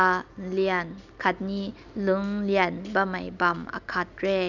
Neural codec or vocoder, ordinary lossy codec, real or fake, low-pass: none; none; real; 7.2 kHz